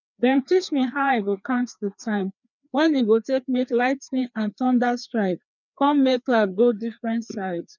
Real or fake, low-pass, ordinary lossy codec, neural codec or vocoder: fake; 7.2 kHz; none; codec, 16 kHz, 2 kbps, FreqCodec, larger model